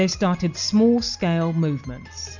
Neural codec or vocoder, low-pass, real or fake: none; 7.2 kHz; real